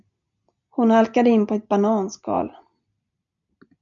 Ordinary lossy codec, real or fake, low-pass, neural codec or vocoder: MP3, 64 kbps; real; 7.2 kHz; none